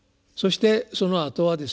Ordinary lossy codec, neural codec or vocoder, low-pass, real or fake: none; none; none; real